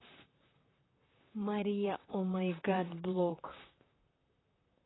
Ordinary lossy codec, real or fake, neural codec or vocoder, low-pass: AAC, 16 kbps; fake; vocoder, 44.1 kHz, 128 mel bands, Pupu-Vocoder; 7.2 kHz